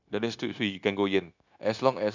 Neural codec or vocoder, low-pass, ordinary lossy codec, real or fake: codec, 16 kHz, 0.9 kbps, LongCat-Audio-Codec; 7.2 kHz; none; fake